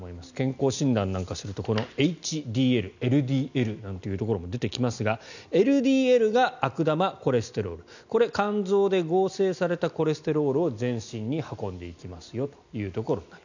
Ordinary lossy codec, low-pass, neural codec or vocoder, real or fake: none; 7.2 kHz; none; real